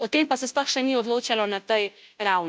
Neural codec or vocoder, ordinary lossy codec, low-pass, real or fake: codec, 16 kHz, 0.5 kbps, FunCodec, trained on Chinese and English, 25 frames a second; none; none; fake